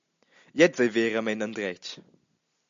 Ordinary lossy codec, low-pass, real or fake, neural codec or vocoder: MP3, 64 kbps; 7.2 kHz; real; none